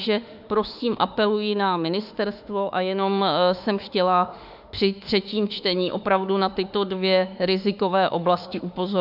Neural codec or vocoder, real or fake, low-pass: autoencoder, 48 kHz, 32 numbers a frame, DAC-VAE, trained on Japanese speech; fake; 5.4 kHz